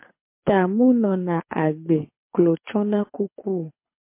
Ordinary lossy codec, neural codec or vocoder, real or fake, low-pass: MP3, 24 kbps; codec, 24 kHz, 6 kbps, HILCodec; fake; 3.6 kHz